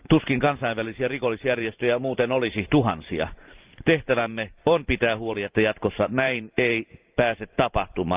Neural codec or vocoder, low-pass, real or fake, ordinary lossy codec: vocoder, 44.1 kHz, 128 mel bands every 512 samples, BigVGAN v2; 3.6 kHz; fake; Opus, 24 kbps